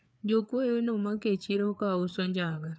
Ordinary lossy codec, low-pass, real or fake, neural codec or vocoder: none; none; fake; codec, 16 kHz, 4 kbps, FreqCodec, larger model